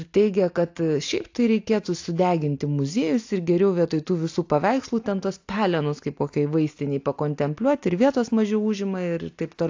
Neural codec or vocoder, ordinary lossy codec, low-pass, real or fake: none; AAC, 48 kbps; 7.2 kHz; real